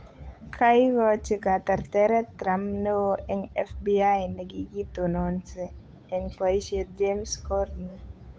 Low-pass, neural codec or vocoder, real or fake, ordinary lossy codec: none; codec, 16 kHz, 8 kbps, FunCodec, trained on Chinese and English, 25 frames a second; fake; none